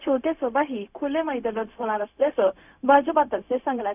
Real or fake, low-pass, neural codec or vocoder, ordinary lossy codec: fake; 3.6 kHz; codec, 16 kHz, 0.4 kbps, LongCat-Audio-Codec; none